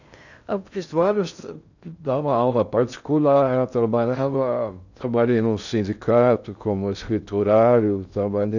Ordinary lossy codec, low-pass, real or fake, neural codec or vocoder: none; 7.2 kHz; fake; codec, 16 kHz in and 24 kHz out, 0.6 kbps, FocalCodec, streaming, 2048 codes